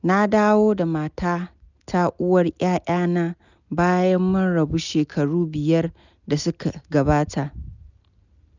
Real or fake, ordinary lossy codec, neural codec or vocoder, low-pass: real; none; none; 7.2 kHz